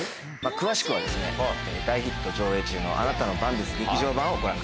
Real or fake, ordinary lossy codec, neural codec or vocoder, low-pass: real; none; none; none